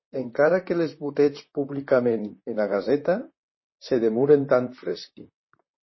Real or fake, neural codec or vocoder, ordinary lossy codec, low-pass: fake; vocoder, 44.1 kHz, 128 mel bands, Pupu-Vocoder; MP3, 24 kbps; 7.2 kHz